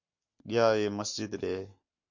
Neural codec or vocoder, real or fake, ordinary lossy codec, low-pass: codec, 44.1 kHz, 7.8 kbps, Pupu-Codec; fake; MP3, 48 kbps; 7.2 kHz